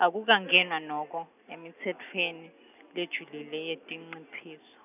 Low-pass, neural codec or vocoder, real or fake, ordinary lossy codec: 3.6 kHz; none; real; none